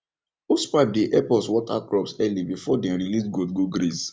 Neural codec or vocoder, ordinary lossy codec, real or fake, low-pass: none; none; real; none